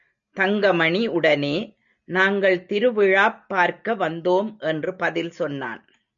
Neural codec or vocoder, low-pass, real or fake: none; 7.2 kHz; real